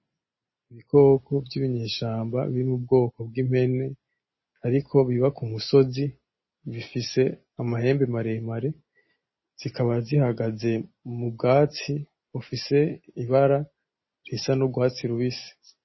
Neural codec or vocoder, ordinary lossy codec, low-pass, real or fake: none; MP3, 24 kbps; 7.2 kHz; real